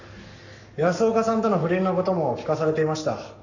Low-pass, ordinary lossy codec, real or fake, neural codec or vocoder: 7.2 kHz; none; fake; codec, 44.1 kHz, 7.8 kbps, Pupu-Codec